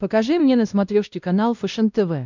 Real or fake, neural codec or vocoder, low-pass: fake; codec, 16 kHz, 1 kbps, X-Codec, WavLM features, trained on Multilingual LibriSpeech; 7.2 kHz